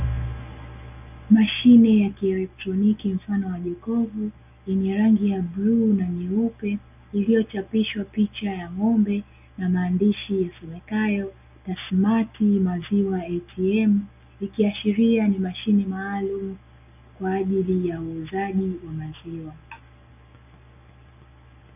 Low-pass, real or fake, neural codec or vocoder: 3.6 kHz; real; none